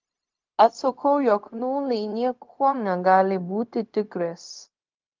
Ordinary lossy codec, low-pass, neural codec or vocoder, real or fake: Opus, 24 kbps; 7.2 kHz; codec, 16 kHz, 0.4 kbps, LongCat-Audio-Codec; fake